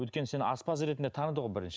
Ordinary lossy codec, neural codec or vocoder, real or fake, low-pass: none; none; real; none